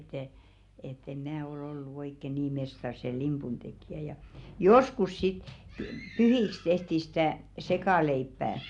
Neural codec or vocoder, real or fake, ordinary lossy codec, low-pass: none; real; none; 10.8 kHz